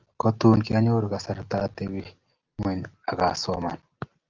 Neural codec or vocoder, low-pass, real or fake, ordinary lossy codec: none; 7.2 kHz; real; Opus, 24 kbps